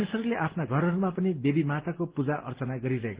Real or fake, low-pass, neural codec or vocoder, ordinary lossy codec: real; 3.6 kHz; none; Opus, 16 kbps